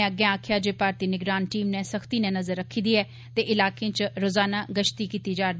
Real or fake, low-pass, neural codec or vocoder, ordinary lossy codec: real; none; none; none